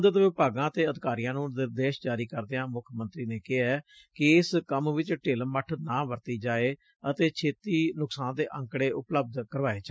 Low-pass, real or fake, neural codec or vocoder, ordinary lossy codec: none; real; none; none